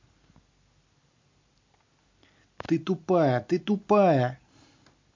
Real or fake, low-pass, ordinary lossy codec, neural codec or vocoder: real; 7.2 kHz; MP3, 48 kbps; none